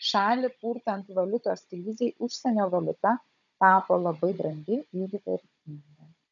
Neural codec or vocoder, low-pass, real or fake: codec, 16 kHz, 16 kbps, FunCodec, trained on Chinese and English, 50 frames a second; 7.2 kHz; fake